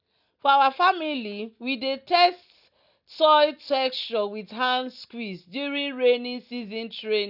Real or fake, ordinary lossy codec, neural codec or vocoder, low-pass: real; none; none; 5.4 kHz